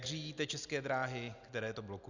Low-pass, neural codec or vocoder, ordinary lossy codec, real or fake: 7.2 kHz; none; Opus, 64 kbps; real